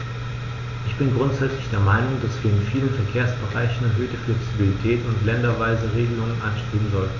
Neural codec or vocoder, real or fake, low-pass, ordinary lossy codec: none; real; 7.2 kHz; none